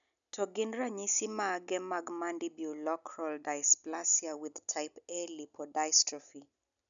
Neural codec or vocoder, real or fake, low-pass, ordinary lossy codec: none; real; 7.2 kHz; none